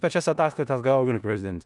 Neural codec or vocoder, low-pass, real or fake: codec, 16 kHz in and 24 kHz out, 0.4 kbps, LongCat-Audio-Codec, four codebook decoder; 10.8 kHz; fake